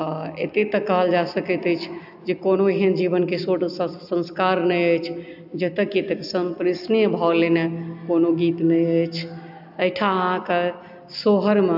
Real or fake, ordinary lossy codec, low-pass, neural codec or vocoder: real; AAC, 48 kbps; 5.4 kHz; none